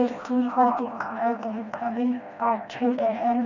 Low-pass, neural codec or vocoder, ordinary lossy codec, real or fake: 7.2 kHz; codec, 16 kHz, 1 kbps, FreqCodec, smaller model; none; fake